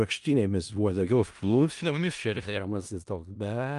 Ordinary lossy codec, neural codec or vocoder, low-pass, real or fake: Opus, 32 kbps; codec, 16 kHz in and 24 kHz out, 0.4 kbps, LongCat-Audio-Codec, four codebook decoder; 10.8 kHz; fake